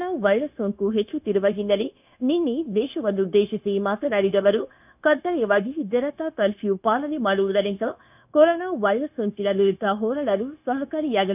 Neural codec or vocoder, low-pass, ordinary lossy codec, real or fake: codec, 16 kHz, 0.9 kbps, LongCat-Audio-Codec; 3.6 kHz; none; fake